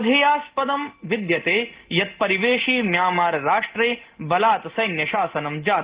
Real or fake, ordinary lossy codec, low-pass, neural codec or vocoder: real; Opus, 16 kbps; 3.6 kHz; none